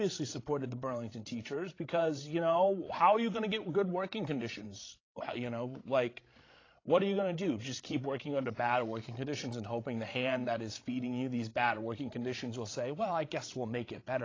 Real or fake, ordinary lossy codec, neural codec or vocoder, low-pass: fake; AAC, 32 kbps; codec, 16 kHz, 16 kbps, FreqCodec, larger model; 7.2 kHz